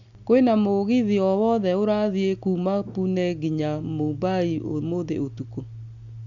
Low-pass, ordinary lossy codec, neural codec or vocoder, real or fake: 7.2 kHz; none; none; real